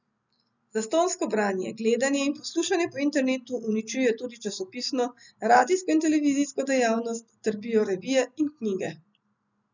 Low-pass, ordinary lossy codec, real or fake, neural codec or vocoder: 7.2 kHz; none; real; none